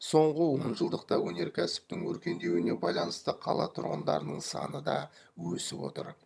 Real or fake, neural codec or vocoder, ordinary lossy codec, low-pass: fake; vocoder, 22.05 kHz, 80 mel bands, HiFi-GAN; none; none